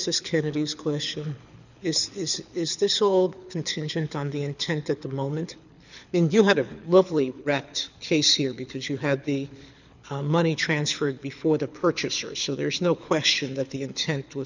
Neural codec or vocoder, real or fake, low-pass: codec, 24 kHz, 6 kbps, HILCodec; fake; 7.2 kHz